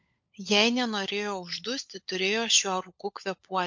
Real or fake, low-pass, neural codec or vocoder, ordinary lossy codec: fake; 7.2 kHz; codec, 16 kHz, 16 kbps, FunCodec, trained on LibriTTS, 50 frames a second; MP3, 48 kbps